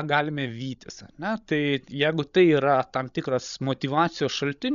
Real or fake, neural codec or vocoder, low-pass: fake; codec, 16 kHz, 16 kbps, FreqCodec, larger model; 7.2 kHz